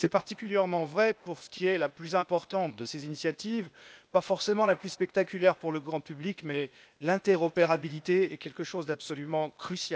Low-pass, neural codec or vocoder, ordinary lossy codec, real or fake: none; codec, 16 kHz, 0.8 kbps, ZipCodec; none; fake